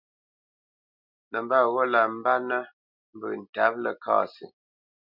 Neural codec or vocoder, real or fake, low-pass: none; real; 5.4 kHz